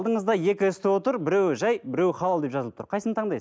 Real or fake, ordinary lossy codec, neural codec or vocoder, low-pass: real; none; none; none